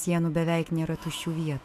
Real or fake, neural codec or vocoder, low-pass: fake; autoencoder, 48 kHz, 128 numbers a frame, DAC-VAE, trained on Japanese speech; 14.4 kHz